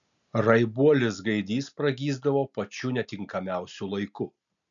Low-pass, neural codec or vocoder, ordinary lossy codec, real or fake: 7.2 kHz; none; MP3, 96 kbps; real